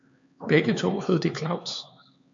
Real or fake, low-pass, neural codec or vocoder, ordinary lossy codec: fake; 7.2 kHz; codec, 16 kHz, 4 kbps, X-Codec, HuBERT features, trained on LibriSpeech; MP3, 64 kbps